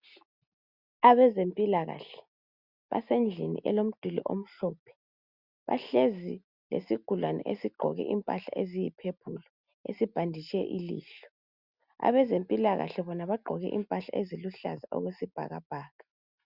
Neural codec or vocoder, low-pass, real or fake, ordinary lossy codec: none; 5.4 kHz; real; Opus, 64 kbps